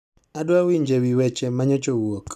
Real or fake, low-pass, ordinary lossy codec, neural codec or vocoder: real; 14.4 kHz; none; none